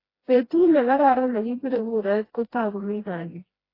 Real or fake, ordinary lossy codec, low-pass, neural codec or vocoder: fake; AAC, 24 kbps; 5.4 kHz; codec, 16 kHz, 1 kbps, FreqCodec, smaller model